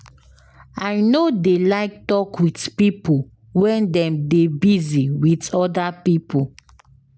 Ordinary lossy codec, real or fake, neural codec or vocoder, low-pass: none; real; none; none